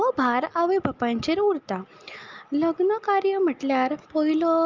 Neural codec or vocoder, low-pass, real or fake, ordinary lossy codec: none; 7.2 kHz; real; Opus, 24 kbps